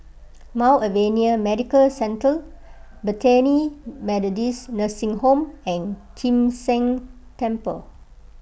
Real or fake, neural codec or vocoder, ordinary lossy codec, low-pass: real; none; none; none